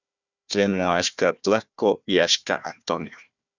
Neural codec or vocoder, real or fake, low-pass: codec, 16 kHz, 1 kbps, FunCodec, trained on Chinese and English, 50 frames a second; fake; 7.2 kHz